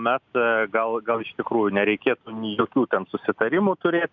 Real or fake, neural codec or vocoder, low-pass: real; none; 7.2 kHz